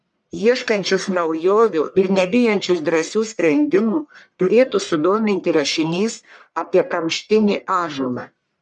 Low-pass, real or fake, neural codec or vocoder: 10.8 kHz; fake; codec, 44.1 kHz, 1.7 kbps, Pupu-Codec